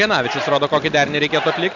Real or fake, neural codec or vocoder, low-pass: real; none; 7.2 kHz